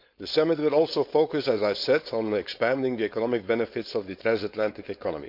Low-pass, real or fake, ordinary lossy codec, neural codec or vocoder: 5.4 kHz; fake; MP3, 48 kbps; codec, 16 kHz, 4.8 kbps, FACodec